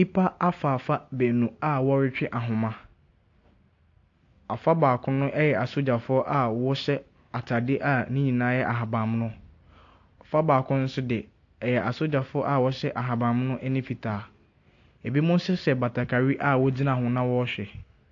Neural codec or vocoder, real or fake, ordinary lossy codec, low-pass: none; real; AAC, 48 kbps; 7.2 kHz